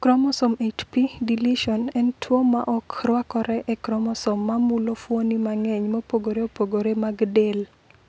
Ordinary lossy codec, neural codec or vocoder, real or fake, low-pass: none; none; real; none